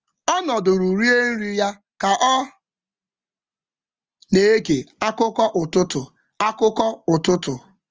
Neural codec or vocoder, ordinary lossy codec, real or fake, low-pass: none; Opus, 24 kbps; real; 7.2 kHz